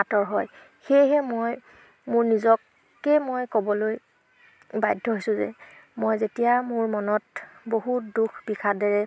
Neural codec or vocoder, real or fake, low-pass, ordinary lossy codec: none; real; none; none